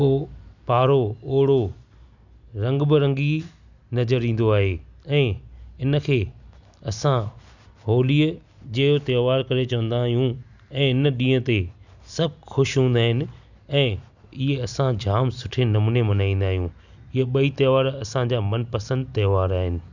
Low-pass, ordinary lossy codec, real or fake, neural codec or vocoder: 7.2 kHz; none; real; none